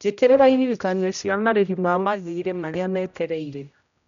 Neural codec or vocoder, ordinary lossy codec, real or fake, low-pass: codec, 16 kHz, 0.5 kbps, X-Codec, HuBERT features, trained on general audio; none; fake; 7.2 kHz